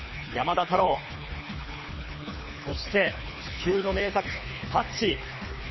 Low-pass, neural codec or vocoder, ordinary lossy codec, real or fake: 7.2 kHz; codec, 24 kHz, 3 kbps, HILCodec; MP3, 24 kbps; fake